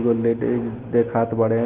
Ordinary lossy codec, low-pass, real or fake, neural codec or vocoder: Opus, 16 kbps; 3.6 kHz; real; none